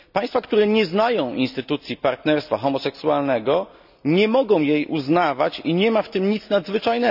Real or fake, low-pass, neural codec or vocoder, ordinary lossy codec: real; 5.4 kHz; none; none